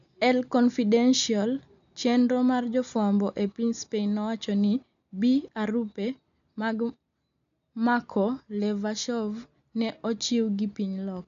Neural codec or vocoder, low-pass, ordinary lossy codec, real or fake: none; 7.2 kHz; none; real